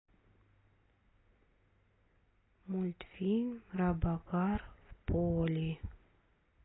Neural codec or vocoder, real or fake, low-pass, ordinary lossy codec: none; real; 7.2 kHz; AAC, 16 kbps